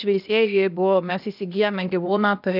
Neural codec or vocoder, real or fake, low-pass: codec, 16 kHz, 0.8 kbps, ZipCodec; fake; 5.4 kHz